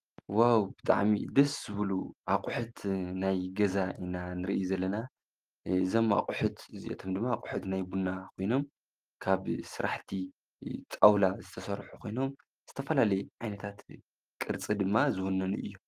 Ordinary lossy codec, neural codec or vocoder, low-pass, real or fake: Opus, 24 kbps; none; 14.4 kHz; real